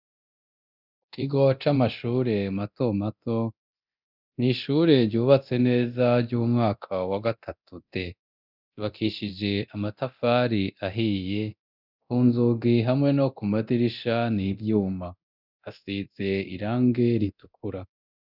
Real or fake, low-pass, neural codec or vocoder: fake; 5.4 kHz; codec, 24 kHz, 0.9 kbps, DualCodec